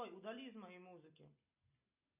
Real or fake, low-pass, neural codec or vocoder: real; 3.6 kHz; none